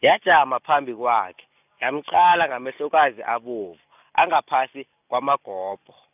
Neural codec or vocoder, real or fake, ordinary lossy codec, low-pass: none; real; none; 3.6 kHz